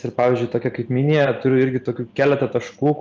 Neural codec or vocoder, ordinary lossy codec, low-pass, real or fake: none; Opus, 32 kbps; 7.2 kHz; real